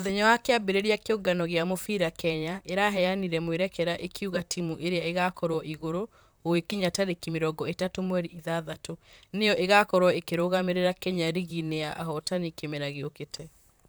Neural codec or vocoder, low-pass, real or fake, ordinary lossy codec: vocoder, 44.1 kHz, 128 mel bands, Pupu-Vocoder; none; fake; none